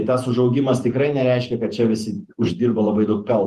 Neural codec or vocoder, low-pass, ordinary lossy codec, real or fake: vocoder, 48 kHz, 128 mel bands, Vocos; 14.4 kHz; Opus, 24 kbps; fake